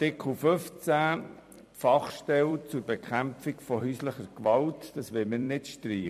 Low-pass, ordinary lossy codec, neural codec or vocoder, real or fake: 14.4 kHz; MP3, 64 kbps; vocoder, 44.1 kHz, 128 mel bands every 256 samples, BigVGAN v2; fake